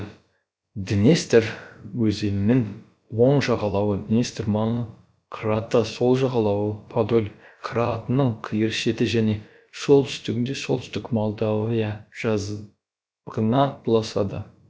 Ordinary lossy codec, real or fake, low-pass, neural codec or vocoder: none; fake; none; codec, 16 kHz, about 1 kbps, DyCAST, with the encoder's durations